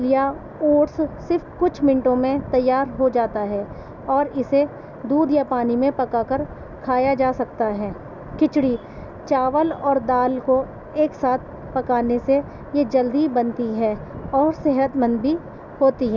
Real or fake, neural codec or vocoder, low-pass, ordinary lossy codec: real; none; 7.2 kHz; none